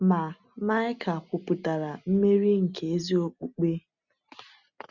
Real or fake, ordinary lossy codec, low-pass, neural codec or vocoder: real; none; 7.2 kHz; none